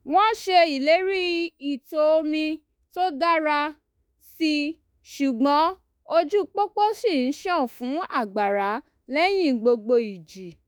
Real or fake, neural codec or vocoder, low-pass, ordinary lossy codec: fake; autoencoder, 48 kHz, 32 numbers a frame, DAC-VAE, trained on Japanese speech; none; none